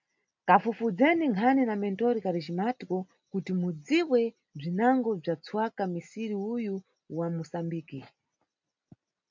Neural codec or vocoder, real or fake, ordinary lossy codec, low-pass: none; real; MP3, 64 kbps; 7.2 kHz